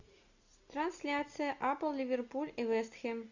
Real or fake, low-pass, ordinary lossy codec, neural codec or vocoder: real; 7.2 kHz; Opus, 64 kbps; none